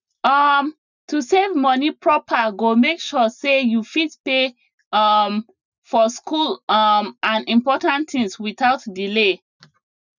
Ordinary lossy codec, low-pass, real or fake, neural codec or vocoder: none; 7.2 kHz; real; none